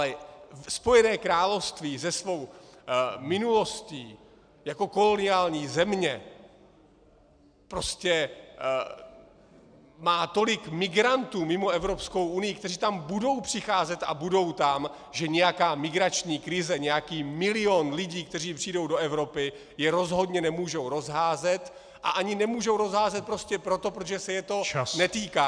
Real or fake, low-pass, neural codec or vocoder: real; 9.9 kHz; none